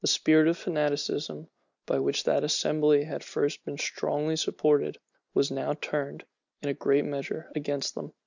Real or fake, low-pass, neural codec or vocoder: real; 7.2 kHz; none